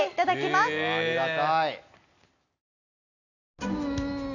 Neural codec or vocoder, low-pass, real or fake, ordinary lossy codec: none; 7.2 kHz; real; none